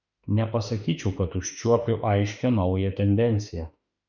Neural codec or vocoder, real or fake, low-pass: autoencoder, 48 kHz, 32 numbers a frame, DAC-VAE, trained on Japanese speech; fake; 7.2 kHz